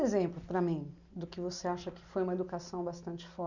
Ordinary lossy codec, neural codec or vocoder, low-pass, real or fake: none; none; 7.2 kHz; real